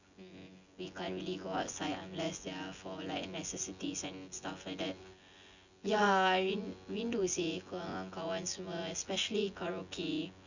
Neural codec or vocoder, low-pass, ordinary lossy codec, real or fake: vocoder, 24 kHz, 100 mel bands, Vocos; 7.2 kHz; none; fake